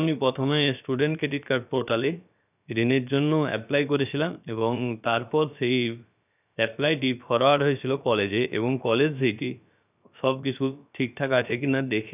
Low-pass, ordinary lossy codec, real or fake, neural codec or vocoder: 3.6 kHz; none; fake; codec, 16 kHz, about 1 kbps, DyCAST, with the encoder's durations